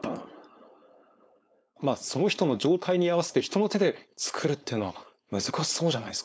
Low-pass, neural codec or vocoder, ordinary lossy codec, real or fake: none; codec, 16 kHz, 4.8 kbps, FACodec; none; fake